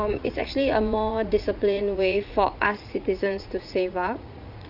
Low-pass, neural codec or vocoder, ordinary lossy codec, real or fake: 5.4 kHz; vocoder, 44.1 kHz, 80 mel bands, Vocos; none; fake